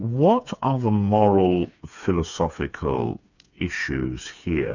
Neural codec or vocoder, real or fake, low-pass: codec, 16 kHz, 4 kbps, FreqCodec, smaller model; fake; 7.2 kHz